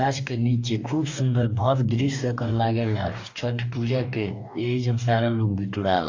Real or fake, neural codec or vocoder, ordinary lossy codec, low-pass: fake; codec, 44.1 kHz, 2.6 kbps, DAC; AAC, 48 kbps; 7.2 kHz